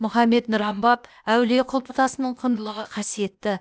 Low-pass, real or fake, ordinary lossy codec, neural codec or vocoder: none; fake; none; codec, 16 kHz, 0.8 kbps, ZipCodec